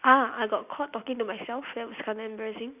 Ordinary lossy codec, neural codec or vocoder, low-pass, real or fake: none; none; 3.6 kHz; real